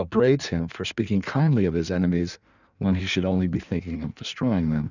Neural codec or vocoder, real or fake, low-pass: codec, 16 kHz in and 24 kHz out, 1.1 kbps, FireRedTTS-2 codec; fake; 7.2 kHz